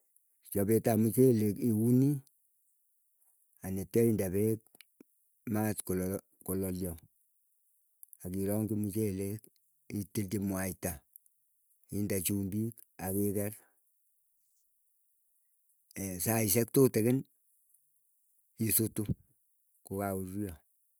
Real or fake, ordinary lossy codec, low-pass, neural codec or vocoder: real; none; none; none